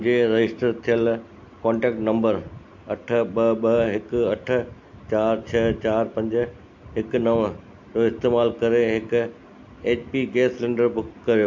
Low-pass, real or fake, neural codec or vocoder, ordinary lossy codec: 7.2 kHz; real; none; MP3, 48 kbps